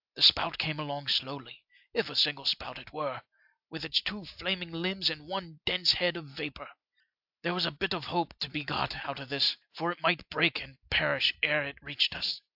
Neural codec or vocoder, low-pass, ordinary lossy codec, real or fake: none; 5.4 kHz; AAC, 48 kbps; real